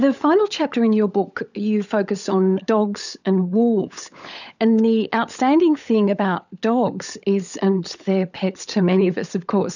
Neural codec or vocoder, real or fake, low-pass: codec, 16 kHz, 16 kbps, FunCodec, trained on LibriTTS, 50 frames a second; fake; 7.2 kHz